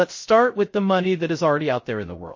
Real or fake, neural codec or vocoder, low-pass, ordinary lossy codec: fake; codec, 16 kHz, 0.3 kbps, FocalCodec; 7.2 kHz; MP3, 32 kbps